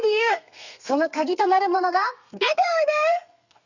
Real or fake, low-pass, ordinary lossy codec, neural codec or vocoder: fake; 7.2 kHz; none; codec, 32 kHz, 1.9 kbps, SNAC